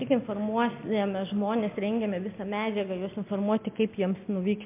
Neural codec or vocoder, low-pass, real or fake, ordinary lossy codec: none; 3.6 kHz; real; MP3, 32 kbps